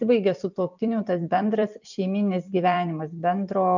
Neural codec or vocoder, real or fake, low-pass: vocoder, 44.1 kHz, 128 mel bands every 256 samples, BigVGAN v2; fake; 7.2 kHz